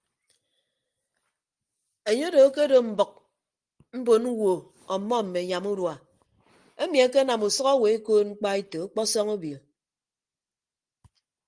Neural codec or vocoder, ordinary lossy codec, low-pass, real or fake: none; Opus, 24 kbps; 9.9 kHz; real